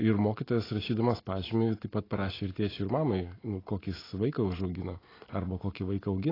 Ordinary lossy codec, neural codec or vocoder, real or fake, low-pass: AAC, 24 kbps; none; real; 5.4 kHz